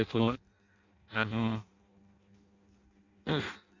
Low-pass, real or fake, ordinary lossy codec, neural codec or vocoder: 7.2 kHz; fake; Opus, 64 kbps; codec, 16 kHz in and 24 kHz out, 0.6 kbps, FireRedTTS-2 codec